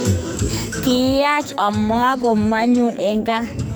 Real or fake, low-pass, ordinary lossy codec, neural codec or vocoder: fake; none; none; codec, 44.1 kHz, 2.6 kbps, SNAC